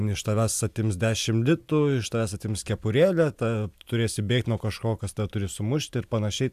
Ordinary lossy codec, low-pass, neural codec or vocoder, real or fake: AAC, 96 kbps; 14.4 kHz; none; real